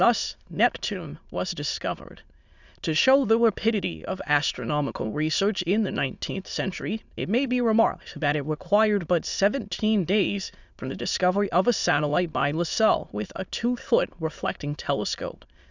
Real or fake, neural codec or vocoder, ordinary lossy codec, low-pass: fake; autoencoder, 22.05 kHz, a latent of 192 numbers a frame, VITS, trained on many speakers; Opus, 64 kbps; 7.2 kHz